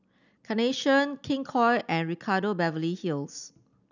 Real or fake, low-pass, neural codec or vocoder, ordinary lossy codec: real; 7.2 kHz; none; none